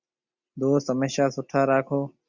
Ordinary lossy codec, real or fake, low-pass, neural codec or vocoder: Opus, 64 kbps; real; 7.2 kHz; none